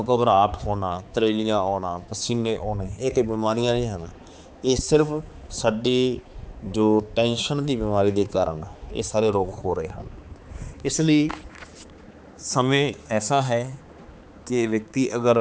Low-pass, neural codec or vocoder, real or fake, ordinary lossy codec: none; codec, 16 kHz, 4 kbps, X-Codec, HuBERT features, trained on balanced general audio; fake; none